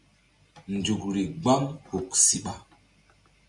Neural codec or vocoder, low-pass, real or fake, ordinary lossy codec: none; 10.8 kHz; real; MP3, 96 kbps